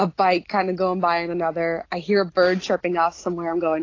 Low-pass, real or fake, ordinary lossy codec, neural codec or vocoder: 7.2 kHz; real; AAC, 32 kbps; none